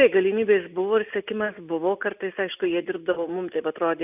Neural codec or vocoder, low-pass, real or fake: none; 3.6 kHz; real